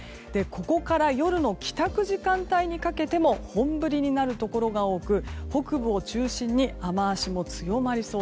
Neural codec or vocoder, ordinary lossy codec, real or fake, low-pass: none; none; real; none